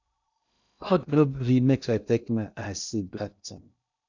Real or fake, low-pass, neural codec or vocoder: fake; 7.2 kHz; codec, 16 kHz in and 24 kHz out, 0.6 kbps, FocalCodec, streaming, 2048 codes